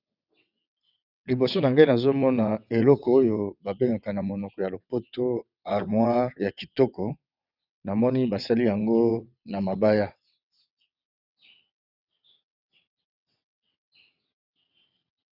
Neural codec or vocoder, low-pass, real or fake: vocoder, 22.05 kHz, 80 mel bands, WaveNeXt; 5.4 kHz; fake